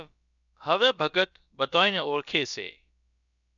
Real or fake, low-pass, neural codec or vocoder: fake; 7.2 kHz; codec, 16 kHz, about 1 kbps, DyCAST, with the encoder's durations